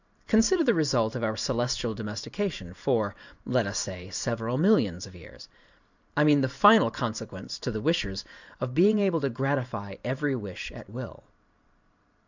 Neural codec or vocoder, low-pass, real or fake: vocoder, 44.1 kHz, 128 mel bands every 512 samples, BigVGAN v2; 7.2 kHz; fake